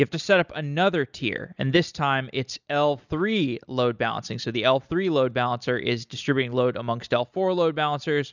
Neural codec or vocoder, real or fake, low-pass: none; real; 7.2 kHz